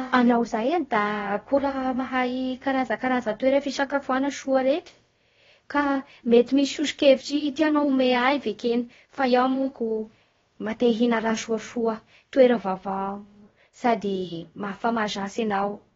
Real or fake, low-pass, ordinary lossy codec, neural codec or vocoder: fake; 7.2 kHz; AAC, 24 kbps; codec, 16 kHz, about 1 kbps, DyCAST, with the encoder's durations